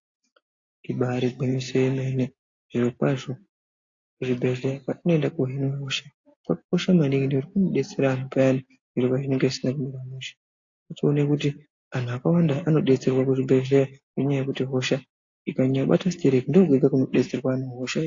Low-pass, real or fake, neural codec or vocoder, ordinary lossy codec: 7.2 kHz; real; none; AAC, 48 kbps